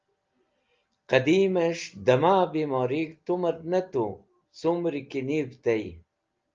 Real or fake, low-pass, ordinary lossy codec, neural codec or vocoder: real; 7.2 kHz; Opus, 24 kbps; none